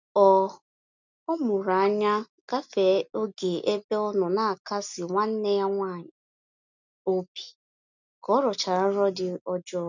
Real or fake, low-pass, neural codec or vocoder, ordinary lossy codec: real; 7.2 kHz; none; none